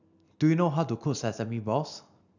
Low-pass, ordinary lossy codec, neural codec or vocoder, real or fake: 7.2 kHz; none; none; real